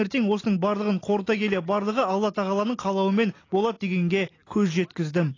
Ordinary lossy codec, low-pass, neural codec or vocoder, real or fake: AAC, 32 kbps; 7.2 kHz; none; real